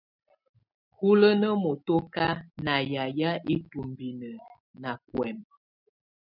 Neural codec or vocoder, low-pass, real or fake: none; 5.4 kHz; real